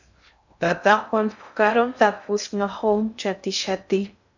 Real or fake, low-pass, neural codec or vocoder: fake; 7.2 kHz; codec, 16 kHz in and 24 kHz out, 0.6 kbps, FocalCodec, streaming, 2048 codes